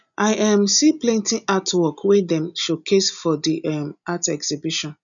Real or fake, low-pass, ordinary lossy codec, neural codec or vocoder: real; 7.2 kHz; none; none